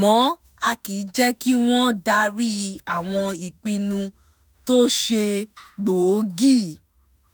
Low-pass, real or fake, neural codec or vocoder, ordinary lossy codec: none; fake; autoencoder, 48 kHz, 32 numbers a frame, DAC-VAE, trained on Japanese speech; none